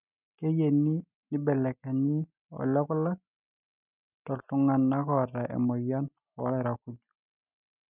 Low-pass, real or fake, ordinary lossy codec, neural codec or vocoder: 3.6 kHz; real; none; none